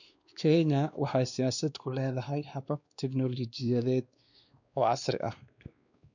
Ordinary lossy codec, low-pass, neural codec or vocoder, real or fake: none; 7.2 kHz; codec, 16 kHz, 2 kbps, X-Codec, WavLM features, trained on Multilingual LibriSpeech; fake